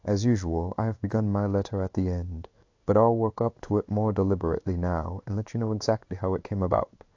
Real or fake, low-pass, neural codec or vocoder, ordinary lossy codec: fake; 7.2 kHz; codec, 16 kHz in and 24 kHz out, 1 kbps, XY-Tokenizer; AAC, 48 kbps